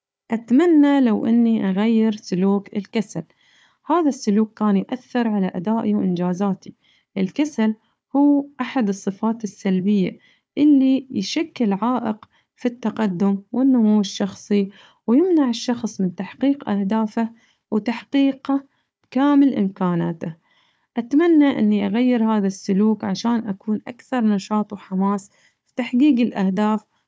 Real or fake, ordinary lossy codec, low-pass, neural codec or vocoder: fake; none; none; codec, 16 kHz, 4 kbps, FunCodec, trained on Chinese and English, 50 frames a second